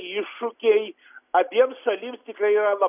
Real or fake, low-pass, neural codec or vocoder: real; 3.6 kHz; none